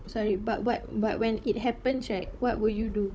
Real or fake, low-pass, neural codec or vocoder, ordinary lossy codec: fake; none; codec, 16 kHz, 16 kbps, FunCodec, trained on Chinese and English, 50 frames a second; none